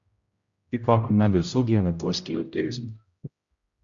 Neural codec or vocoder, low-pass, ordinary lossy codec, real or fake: codec, 16 kHz, 0.5 kbps, X-Codec, HuBERT features, trained on general audio; 7.2 kHz; Opus, 64 kbps; fake